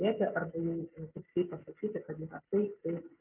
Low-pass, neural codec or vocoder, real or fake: 3.6 kHz; none; real